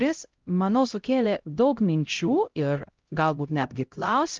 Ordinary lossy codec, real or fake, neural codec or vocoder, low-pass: Opus, 32 kbps; fake; codec, 16 kHz, 0.5 kbps, X-Codec, HuBERT features, trained on LibriSpeech; 7.2 kHz